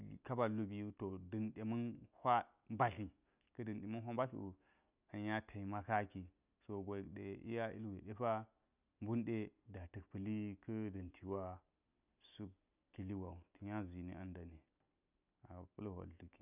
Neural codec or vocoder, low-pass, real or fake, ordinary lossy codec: none; 3.6 kHz; real; none